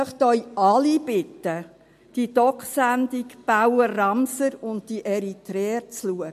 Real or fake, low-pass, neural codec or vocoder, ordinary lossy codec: real; 14.4 kHz; none; MP3, 64 kbps